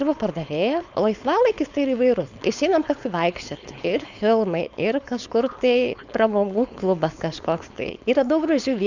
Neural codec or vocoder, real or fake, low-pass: codec, 16 kHz, 4.8 kbps, FACodec; fake; 7.2 kHz